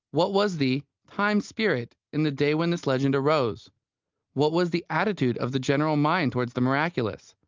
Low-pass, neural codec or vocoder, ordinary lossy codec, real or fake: 7.2 kHz; none; Opus, 24 kbps; real